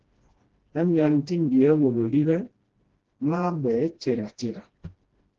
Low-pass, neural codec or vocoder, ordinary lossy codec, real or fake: 7.2 kHz; codec, 16 kHz, 1 kbps, FreqCodec, smaller model; Opus, 16 kbps; fake